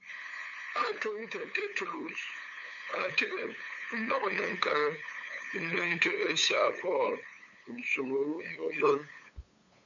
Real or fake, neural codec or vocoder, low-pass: fake; codec, 16 kHz, 8 kbps, FunCodec, trained on LibriTTS, 25 frames a second; 7.2 kHz